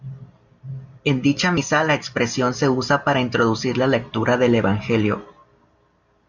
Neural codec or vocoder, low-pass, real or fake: none; 7.2 kHz; real